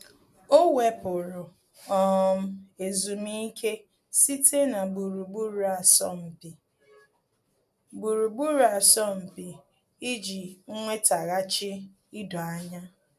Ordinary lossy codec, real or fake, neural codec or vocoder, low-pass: none; real; none; 14.4 kHz